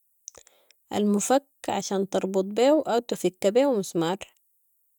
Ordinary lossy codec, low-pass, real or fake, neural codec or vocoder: none; 19.8 kHz; real; none